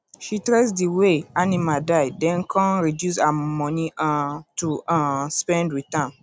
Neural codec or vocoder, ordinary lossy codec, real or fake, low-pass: none; none; real; none